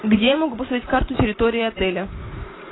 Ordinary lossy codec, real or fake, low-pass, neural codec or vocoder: AAC, 16 kbps; fake; 7.2 kHz; vocoder, 44.1 kHz, 128 mel bands every 512 samples, BigVGAN v2